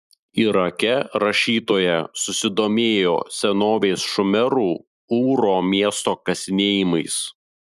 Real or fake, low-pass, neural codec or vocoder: real; 14.4 kHz; none